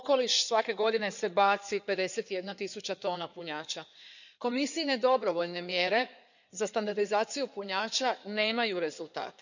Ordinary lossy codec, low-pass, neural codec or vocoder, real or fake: none; 7.2 kHz; codec, 16 kHz in and 24 kHz out, 2.2 kbps, FireRedTTS-2 codec; fake